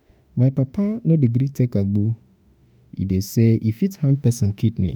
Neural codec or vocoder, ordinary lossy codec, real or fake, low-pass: autoencoder, 48 kHz, 32 numbers a frame, DAC-VAE, trained on Japanese speech; none; fake; none